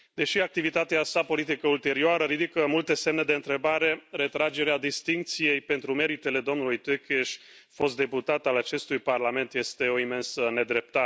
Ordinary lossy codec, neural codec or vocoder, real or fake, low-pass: none; none; real; none